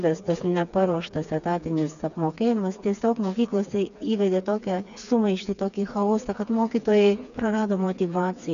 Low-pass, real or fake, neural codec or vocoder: 7.2 kHz; fake; codec, 16 kHz, 4 kbps, FreqCodec, smaller model